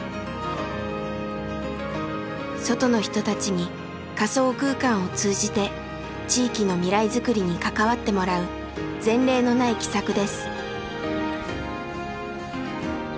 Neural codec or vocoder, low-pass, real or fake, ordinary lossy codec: none; none; real; none